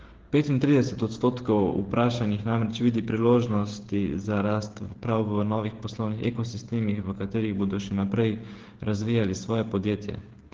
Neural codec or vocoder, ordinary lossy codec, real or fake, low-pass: codec, 16 kHz, 16 kbps, FreqCodec, smaller model; Opus, 16 kbps; fake; 7.2 kHz